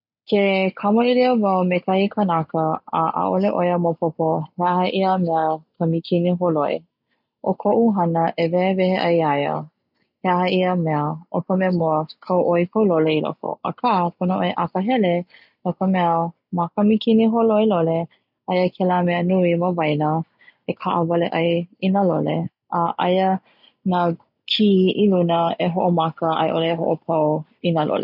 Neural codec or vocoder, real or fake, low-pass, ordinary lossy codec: none; real; 5.4 kHz; none